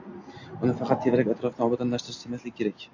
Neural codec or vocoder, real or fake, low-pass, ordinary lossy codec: none; real; 7.2 kHz; AAC, 48 kbps